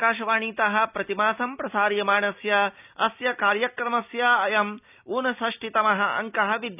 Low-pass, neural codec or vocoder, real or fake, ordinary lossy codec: 3.6 kHz; none; real; none